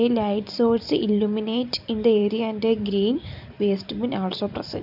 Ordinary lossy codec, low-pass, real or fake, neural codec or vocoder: none; 5.4 kHz; fake; vocoder, 44.1 kHz, 128 mel bands every 256 samples, BigVGAN v2